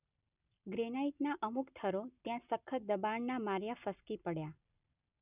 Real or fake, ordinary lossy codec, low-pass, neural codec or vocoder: real; none; 3.6 kHz; none